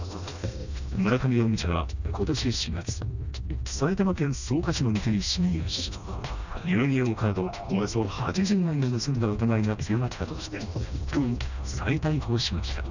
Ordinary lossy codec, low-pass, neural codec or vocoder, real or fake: none; 7.2 kHz; codec, 16 kHz, 1 kbps, FreqCodec, smaller model; fake